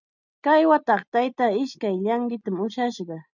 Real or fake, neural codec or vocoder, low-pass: real; none; 7.2 kHz